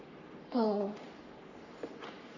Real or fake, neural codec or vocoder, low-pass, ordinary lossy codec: fake; codec, 44.1 kHz, 3.4 kbps, Pupu-Codec; 7.2 kHz; none